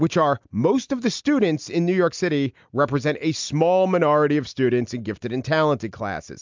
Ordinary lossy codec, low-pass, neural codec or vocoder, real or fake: MP3, 64 kbps; 7.2 kHz; none; real